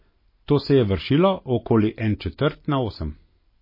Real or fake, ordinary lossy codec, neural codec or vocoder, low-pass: real; MP3, 24 kbps; none; 5.4 kHz